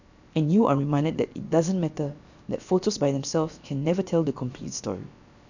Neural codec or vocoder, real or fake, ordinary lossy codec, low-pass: codec, 16 kHz, about 1 kbps, DyCAST, with the encoder's durations; fake; none; 7.2 kHz